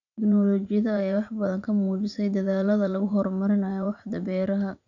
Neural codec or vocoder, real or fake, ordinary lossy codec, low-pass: vocoder, 44.1 kHz, 80 mel bands, Vocos; fake; none; 7.2 kHz